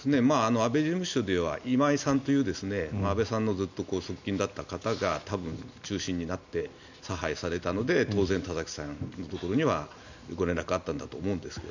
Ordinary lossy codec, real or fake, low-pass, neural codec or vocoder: none; real; 7.2 kHz; none